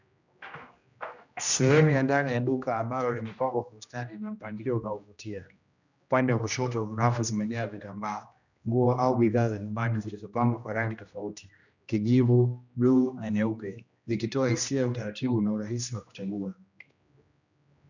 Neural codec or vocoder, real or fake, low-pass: codec, 16 kHz, 1 kbps, X-Codec, HuBERT features, trained on general audio; fake; 7.2 kHz